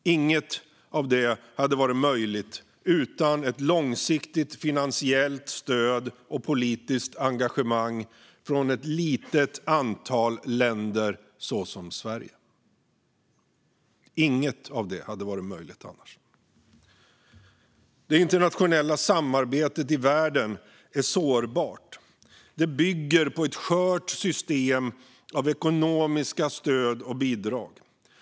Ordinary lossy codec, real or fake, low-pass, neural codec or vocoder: none; real; none; none